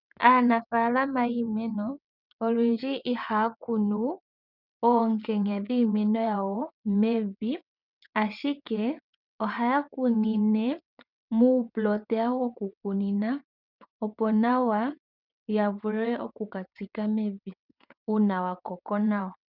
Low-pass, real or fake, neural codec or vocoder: 5.4 kHz; fake; vocoder, 22.05 kHz, 80 mel bands, WaveNeXt